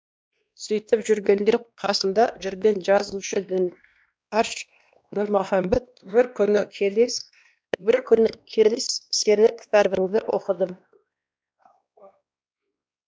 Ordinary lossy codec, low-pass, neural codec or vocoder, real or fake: none; none; codec, 16 kHz, 1 kbps, X-Codec, WavLM features, trained on Multilingual LibriSpeech; fake